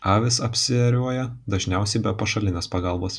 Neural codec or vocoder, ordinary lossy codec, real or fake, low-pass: none; MP3, 96 kbps; real; 9.9 kHz